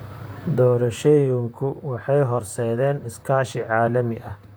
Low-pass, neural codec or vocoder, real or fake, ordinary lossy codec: none; vocoder, 44.1 kHz, 128 mel bands, Pupu-Vocoder; fake; none